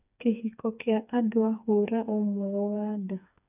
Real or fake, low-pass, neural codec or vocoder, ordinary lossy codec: fake; 3.6 kHz; codec, 16 kHz, 4 kbps, FreqCodec, smaller model; none